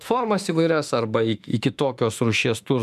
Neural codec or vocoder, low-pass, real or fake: autoencoder, 48 kHz, 32 numbers a frame, DAC-VAE, trained on Japanese speech; 14.4 kHz; fake